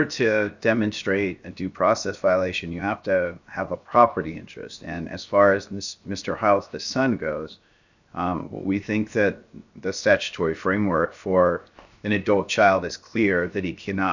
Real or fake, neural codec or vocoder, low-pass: fake; codec, 16 kHz, 0.7 kbps, FocalCodec; 7.2 kHz